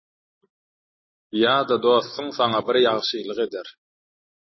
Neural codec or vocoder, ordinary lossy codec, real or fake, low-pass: none; MP3, 24 kbps; real; 7.2 kHz